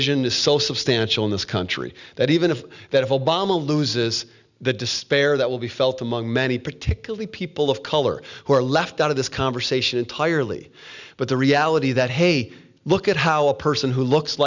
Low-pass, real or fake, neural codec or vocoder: 7.2 kHz; real; none